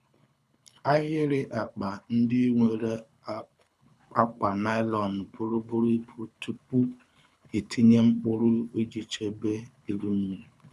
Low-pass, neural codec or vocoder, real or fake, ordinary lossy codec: none; codec, 24 kHz, 6 kbps, HILCodec; fake; none